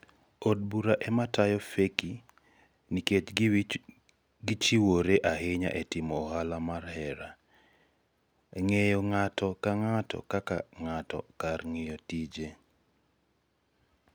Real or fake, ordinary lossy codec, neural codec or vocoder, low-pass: real; none; none; none